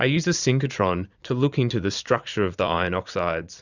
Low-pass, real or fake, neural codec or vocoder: 7.2 kHz; fake; vocoder, 44.1 kHz, 80 mel bands, Vocos